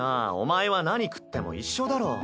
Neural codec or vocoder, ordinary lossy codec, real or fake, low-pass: none; none; real; none